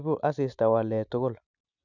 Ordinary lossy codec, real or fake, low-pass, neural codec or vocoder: none; real; 7.2 kHz; none